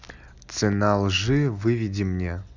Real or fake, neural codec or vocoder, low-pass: real; none; 7.2 kHz